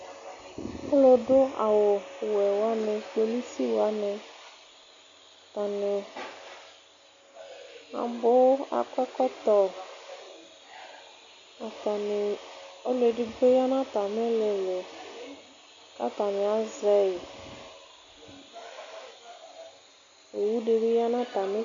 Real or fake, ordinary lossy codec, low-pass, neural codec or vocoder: real; MP3, 64 kbps; 7.2 kHz; none